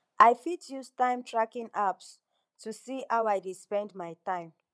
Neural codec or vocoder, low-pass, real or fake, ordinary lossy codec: vocoder, 22.05 kHz, 80 mel bands, Vocos; none; fake; none